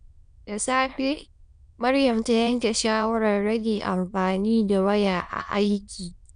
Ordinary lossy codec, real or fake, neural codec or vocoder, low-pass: MP3, 96 kbps; fake; autoencoder, 22.05 kHz, a latent of 192 numbers a frame, VITS, trained on many speakers; 9.9 kHz